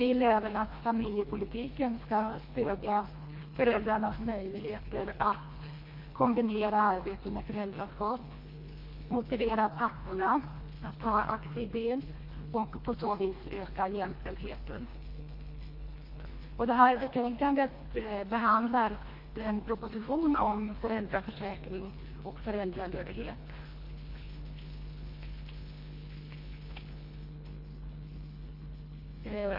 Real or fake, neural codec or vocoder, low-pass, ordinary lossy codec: fake; codec, 24 kHz, 1.5 kbps, HILCodec; 5.4 kHz; MP3, 32 kbps